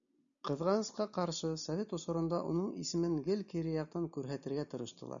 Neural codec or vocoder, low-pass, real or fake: none; 7.2 kHz; real